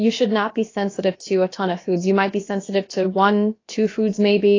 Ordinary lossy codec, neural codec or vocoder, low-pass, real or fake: AAC, 32 kbps; codec, 16 kHz, about 1 kbps, DyCAST, with the encoder's durations; 7.2 kHz; fake